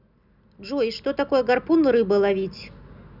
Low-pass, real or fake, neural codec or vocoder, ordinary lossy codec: 5.4 kHz; real; none; none